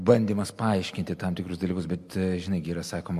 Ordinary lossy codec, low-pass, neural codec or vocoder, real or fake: MP3, 64 kbps; 14.4 kHz; none; real